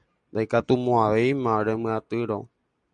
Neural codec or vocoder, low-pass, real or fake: none; 9.9 kHz; real